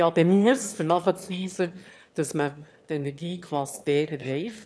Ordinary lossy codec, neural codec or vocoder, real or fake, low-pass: none; autoencoder, 22.05 kHz, a latent of 192 numbers a frame, VITS, trained on one speaker; fake; none